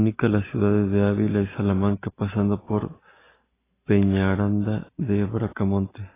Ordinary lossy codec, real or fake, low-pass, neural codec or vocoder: AAC, 16 kbps; real; 3.6 kHz; none